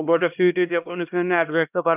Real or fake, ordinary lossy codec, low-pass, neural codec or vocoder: fake; none; 3.6 kHz; codec, 16 kHz, 1 kbps, X-Codec, HuBERT features, trained on LibriSpeech